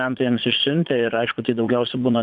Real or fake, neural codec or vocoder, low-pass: fake; vocoder, 22.05 kHz, 80 mel bands, Vocos; 9.9 kHz